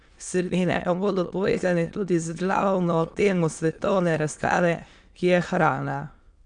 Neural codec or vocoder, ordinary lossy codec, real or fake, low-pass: autoencoder, 22.05 kHz, a latent of 192 numbers a frame, VITS, trained on many speakers; none; fake; 9.9 kHz